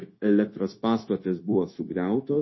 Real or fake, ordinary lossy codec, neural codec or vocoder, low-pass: fake; MP3, 24 kbps; codec, 16 kHz, 0.9 kbps, LongCat-Audio-Codec; 7.2 kHz